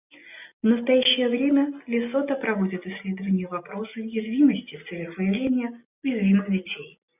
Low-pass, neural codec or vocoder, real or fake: 3.6 kHz; none; real